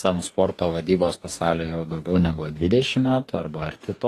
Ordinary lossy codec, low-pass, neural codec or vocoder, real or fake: AAC, 48 kbps; 14.4 kHz; codec, 44.1 kHz, 2.6 kbps, DAC; fake